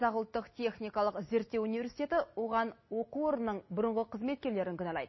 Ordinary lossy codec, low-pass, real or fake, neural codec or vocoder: MP3, 24 kbps; 7.2 kHz; real; none